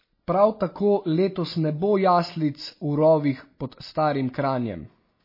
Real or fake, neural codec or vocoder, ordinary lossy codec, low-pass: real; none; MP3, 24 kbps; 5.4 kHz